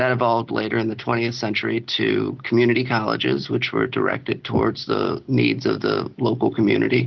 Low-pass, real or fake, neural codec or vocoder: 7.2 kHz; real; none